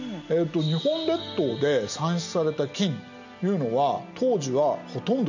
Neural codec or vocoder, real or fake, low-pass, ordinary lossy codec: none; real; 7.2 kHz; none